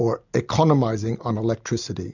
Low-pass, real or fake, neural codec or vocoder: 7.2 kHz; real; none